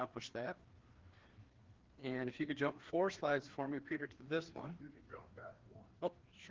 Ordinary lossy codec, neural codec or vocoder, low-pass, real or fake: Opus, 16 kbps; codec, 16 kHz, 2 kbps, FreqCodec, larger model; 7.2 kHz; fake